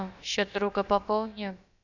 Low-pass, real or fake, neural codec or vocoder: 7.2 kHz; fake; codec, 16 kHz, about 1 kbps, DyCAST, with the encoder's durations